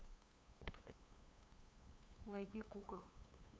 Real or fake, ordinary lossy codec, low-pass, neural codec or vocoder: fake; none; none; codec, 16 kHz, 2 kbps, FunCodec, trained on LibriTTS, 25 frames a second